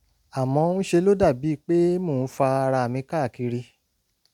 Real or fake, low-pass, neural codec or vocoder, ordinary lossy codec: real; 19.8 kHz; none; none